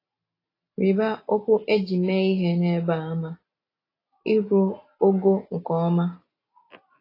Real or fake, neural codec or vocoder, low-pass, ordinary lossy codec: real; none; 5.4 kHz; AAC, 24 kbps